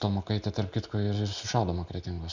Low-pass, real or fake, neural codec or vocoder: 7.2 kHz; real; none